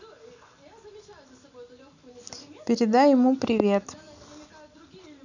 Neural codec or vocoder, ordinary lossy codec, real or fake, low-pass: none; none; real; 7.2 kHz